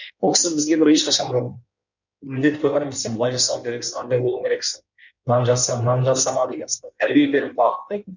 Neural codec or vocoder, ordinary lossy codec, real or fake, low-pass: codec, 16 kHz in and 24 kHz out, 1.1 kbps, FireRedTTS-2 codec; none; fake; 7.2 kHz